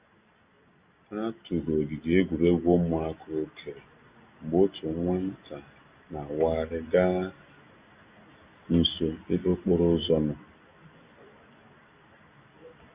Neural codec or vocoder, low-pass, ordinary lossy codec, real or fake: none; 3.6 kHz; Opus, 64 kbps; real